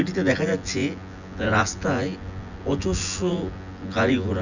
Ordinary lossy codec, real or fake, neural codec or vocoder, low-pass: none; fake; vocoder, 24 kHz, 100 mel bands, Vocos; 7.2 kHz